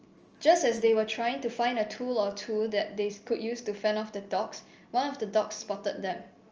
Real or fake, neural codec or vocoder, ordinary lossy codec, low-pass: real; none; Opus, 24 kbps; 7.2 kHz